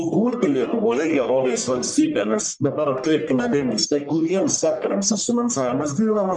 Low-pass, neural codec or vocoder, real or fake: 10.8 kHz; codec, 44.1 kHz, 1.7 kbps, Pupu-Codec; fake